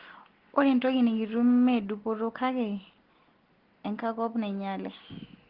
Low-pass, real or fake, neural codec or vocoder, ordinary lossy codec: 5.4 kHz; real; none; Opus, 16 kbps